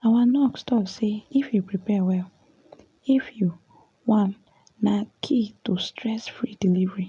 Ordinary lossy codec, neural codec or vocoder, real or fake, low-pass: none; none; real; 10.8 kHz